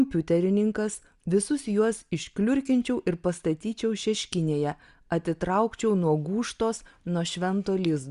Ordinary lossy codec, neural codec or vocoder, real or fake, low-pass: Opus, 64 kbps; none; real; 10.8 kHz